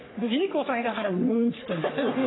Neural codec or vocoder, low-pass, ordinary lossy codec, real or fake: codec, 44.1 kHz, 3.4 kbps, Pupu-Codec; 7.2 kHz; AAC, 16 kbps; fake